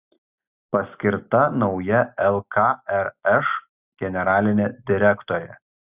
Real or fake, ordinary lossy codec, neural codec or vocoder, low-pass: real; Opus, 64 kbps; none; 3.6 kHz